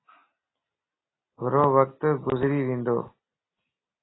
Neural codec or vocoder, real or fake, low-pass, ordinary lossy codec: none; real; 7.2 kHz; AAC, 16 kbps